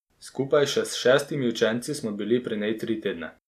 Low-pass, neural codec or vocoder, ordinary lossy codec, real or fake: 14.4 kHz; none; none; real